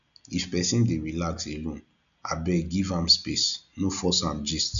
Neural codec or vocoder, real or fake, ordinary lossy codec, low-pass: none; real; none; 7.2 kHz